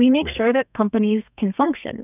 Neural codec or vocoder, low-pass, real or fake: codec, 32 kHz, 1.9 kbps, SNAC; 3.6 kHz; fake